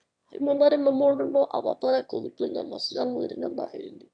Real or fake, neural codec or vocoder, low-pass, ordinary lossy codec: fake; autoencoder, 22.05 kHz, a latent of 192 numbers a frame, VITS, trained on one speaker; 9.9 kHz; AAC, 64 kbps